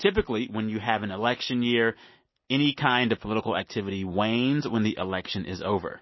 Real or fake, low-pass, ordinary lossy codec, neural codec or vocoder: real; 7.2 kHz; MP3, 24 kbps; none